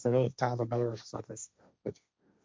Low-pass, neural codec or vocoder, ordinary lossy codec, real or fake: none; codec, 16 kHz, 1.1 kbps, Voila-Tokenizer; none; fake